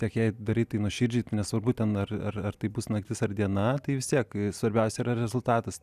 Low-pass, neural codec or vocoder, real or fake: 14.4 kHz; none; real